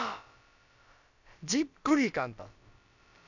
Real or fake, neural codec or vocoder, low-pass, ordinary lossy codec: fake; codec, 16 kHz, about 1 kbps, DyCAST, with the encoder's durations; 7.2 kHz; none